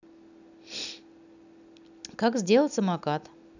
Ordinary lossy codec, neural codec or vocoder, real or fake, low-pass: none; none; real; 7.2 kHz